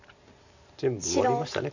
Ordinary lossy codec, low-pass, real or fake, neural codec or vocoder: none; 7.2 kHz; fake; vocoder, 44.1 kHz, 128 mel bands every 512 samples, BigVGAN v2